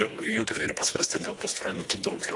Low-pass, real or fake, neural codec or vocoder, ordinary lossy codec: 10.8 kHz; fake; codec, 24 kHz, 1.5 kbps, HILCodec; AAC, 48 kbps